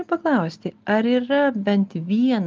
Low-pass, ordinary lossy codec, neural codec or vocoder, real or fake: 7.2 kHz; Opus, 24 kbps; none; real